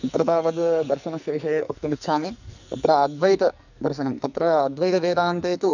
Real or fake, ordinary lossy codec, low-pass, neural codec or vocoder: fake; none; 7.2 kHz; codec, 44.1 kHz, 2.6 kbps, SNAC